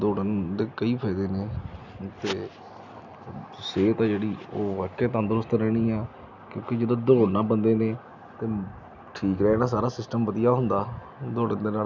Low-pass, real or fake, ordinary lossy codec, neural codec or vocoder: 7.2 kHz; real; none; none